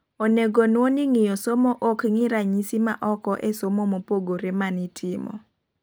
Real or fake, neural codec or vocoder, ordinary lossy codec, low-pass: real; none; none; none